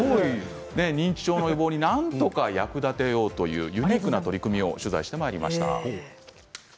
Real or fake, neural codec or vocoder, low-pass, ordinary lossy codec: real; none; none; none